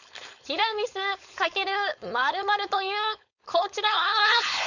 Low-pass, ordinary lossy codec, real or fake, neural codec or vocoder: 7.2 kHz; none; fake; codec, 16 kHz, 4.8 kbps, FACodec